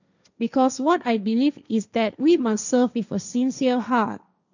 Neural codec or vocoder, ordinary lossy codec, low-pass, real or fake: codec, 16 kHz, 1.1 kbps, Voila-Tokenizer; none; 7.2 kHz; fake